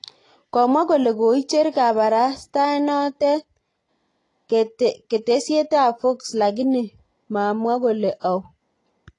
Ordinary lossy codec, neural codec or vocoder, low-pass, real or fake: AAC, 32 kbps; none; 10.8 kHz; real